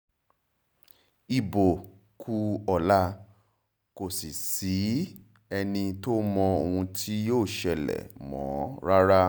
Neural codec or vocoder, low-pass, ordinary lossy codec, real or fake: none; none; none; real